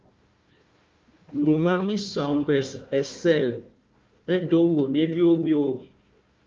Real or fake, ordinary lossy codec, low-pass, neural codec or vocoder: fake; Opus, 24 kbps; 7.2 kHz; codec, 16 kHz, 1 kbps, FunCodec, trained on Chinese and English, 50 frames a second